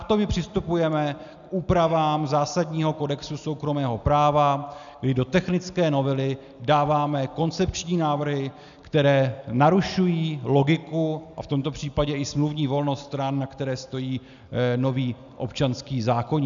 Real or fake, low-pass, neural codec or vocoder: real; 7.2 kHz; none